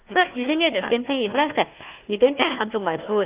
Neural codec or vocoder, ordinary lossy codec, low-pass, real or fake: codec, 16 kHz, 1 kbps, FunCodec, trained on Chinese and English, 50 frames a second; Opus, 32 kbps; 3.6 kHz; fake